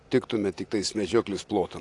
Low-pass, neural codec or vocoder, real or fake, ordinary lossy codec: 10.8 kHz; none; real; AAC, 48 kbps